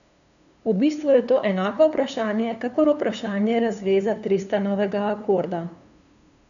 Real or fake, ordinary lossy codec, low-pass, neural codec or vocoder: fake; none; 7.2 kHz; codec, 16 kHz, 2 kbps, FunCodec, trained on LibriTTS, 25 frames a second